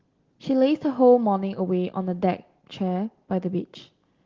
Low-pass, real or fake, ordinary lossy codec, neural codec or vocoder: 7.2 kHz; real; Opus, 16 kbps; none